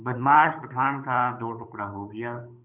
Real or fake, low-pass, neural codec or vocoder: fake; 3.6 kHz; codec, 16 kHz, 8 kbps, FunCodec, trained on Chinese and English, 25 frames a second